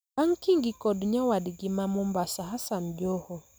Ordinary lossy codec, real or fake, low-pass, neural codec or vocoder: none; real; none; none